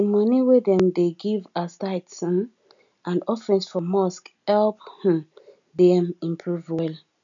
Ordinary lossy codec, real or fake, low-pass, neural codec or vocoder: none; real; 7.2 kHz; none